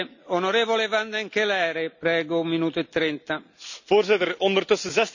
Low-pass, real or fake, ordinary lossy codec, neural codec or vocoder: 7.2 kHz; real; none; none